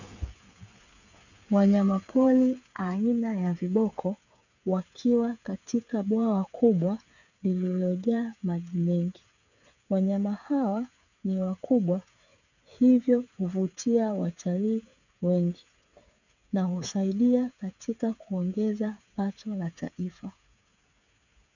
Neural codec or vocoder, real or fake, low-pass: codec, 16 kHz, 8 kbps, FreqCodec, smaller model; fake; 7.2 kHz